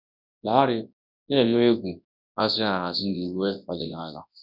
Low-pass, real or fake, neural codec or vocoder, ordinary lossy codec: 5.4 kHz; fake; codec, 24 kHz, 0.9 kbps, WavTokenizer, large speech release; none